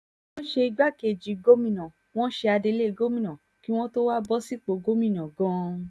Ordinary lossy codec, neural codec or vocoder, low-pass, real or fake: none; none; none; real